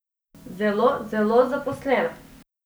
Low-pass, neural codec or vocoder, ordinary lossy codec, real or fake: none; none; none; real